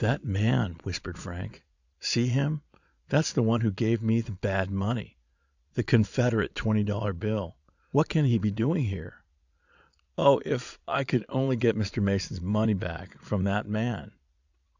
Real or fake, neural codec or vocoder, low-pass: fake; vocoder, 22.05 kHz, 80 mel bands, Vocos; 7.2 kHz